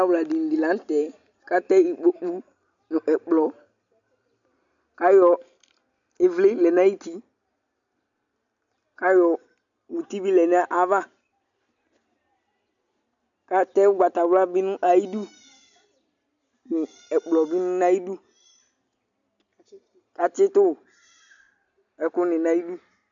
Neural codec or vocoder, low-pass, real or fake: none; 7.2 kHz; real